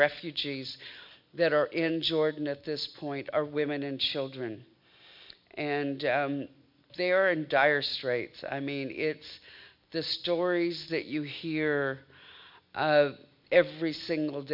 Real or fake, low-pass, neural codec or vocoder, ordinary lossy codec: real; 5.4 kHz; none; MP3, 48 kbps